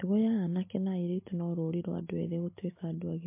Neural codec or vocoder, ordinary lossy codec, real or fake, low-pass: none; MP3, 24 kbps; real; 3.6 kHz